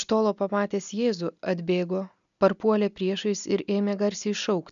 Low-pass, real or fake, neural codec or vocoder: 7.2 kHz; real; none